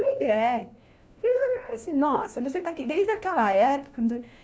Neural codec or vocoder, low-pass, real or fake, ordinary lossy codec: codec, 16 kHz, 1 kbps, FunCodec, trained on LibriTTS, 50 frames a second; none; fake; none